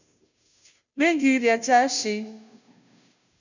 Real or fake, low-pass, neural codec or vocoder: fake; 7.2 kHz; codec, 16 kHz, 0.5 kbps, FunCodec, trained on Chinese and English, 25 frames a second